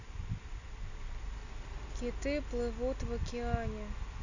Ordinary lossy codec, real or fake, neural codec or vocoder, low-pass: none; real; none; 7.2 kHz